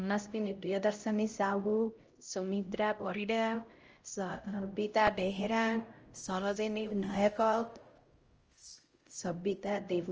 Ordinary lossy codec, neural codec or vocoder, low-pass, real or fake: Opus, 24 kbps; codec, 16 kHz, 0.5 kbps, X-Codec, HuBERT features, trained on LibriSpeech; 7.2 kHz; fake